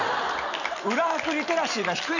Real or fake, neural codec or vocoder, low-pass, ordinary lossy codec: real; none; 7.2 kHz; none